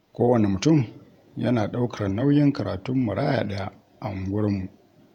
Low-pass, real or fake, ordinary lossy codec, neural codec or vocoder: 19.8 kHz; fake; none; vocoder, 48 kHz, 128 mel bands, Vocos